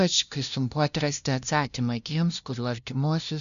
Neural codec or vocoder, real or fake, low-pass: codec, 16 kHz, 0.5 kbps, FunCodec, trained on LibriTTS, 25 frames a second; fake; 7.2 kHz